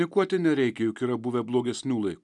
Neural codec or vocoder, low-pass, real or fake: none; 10.8 kHz; real